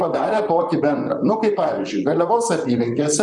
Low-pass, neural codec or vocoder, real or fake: 10.8 kHz; vocoder, 44.1 kHz, 128 mel bands, Pupu-Vocoder; fake